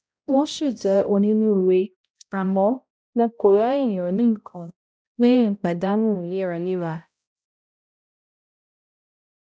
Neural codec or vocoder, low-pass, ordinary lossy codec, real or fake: codec, 16 kHz, 0.5 kbps, X-Codec, HuBERT features, trained on balanced general audio; none; none; fake